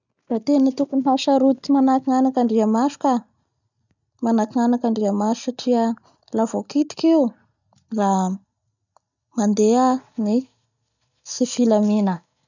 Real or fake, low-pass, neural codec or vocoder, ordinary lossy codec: real; 7.2 kHz; none; none